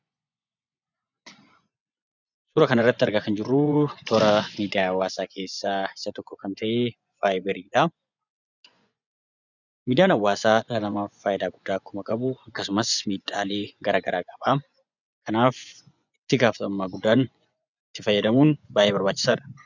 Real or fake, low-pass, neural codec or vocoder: fake; 7.2 kHz; vocoder, 24 kHz, 100 mel bands, Vocos